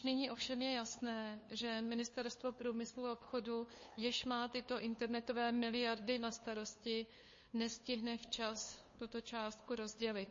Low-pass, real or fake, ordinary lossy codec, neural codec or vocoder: 7.2 kHz; fake; MP3, 32 kbps; codec, 16 kHz, 2 kbps, FunCodec, trained on LibriTTS, 25 frames a second